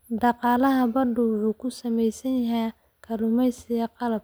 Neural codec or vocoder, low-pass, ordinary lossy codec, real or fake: none; none; none; real